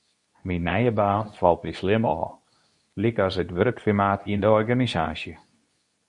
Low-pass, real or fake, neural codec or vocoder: 10.8 kHz; fake; codec, 24 kHz, 0.9 kbps, WavTokenizer, medium speech release version 2